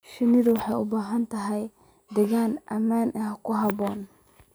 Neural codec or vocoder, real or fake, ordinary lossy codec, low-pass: none; real; none; none